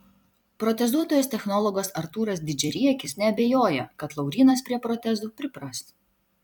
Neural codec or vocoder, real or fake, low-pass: vocoder, 44.1 kHz, 128 mel bands every 256 samples, BigVGAN v2; fake; 19.8 kHz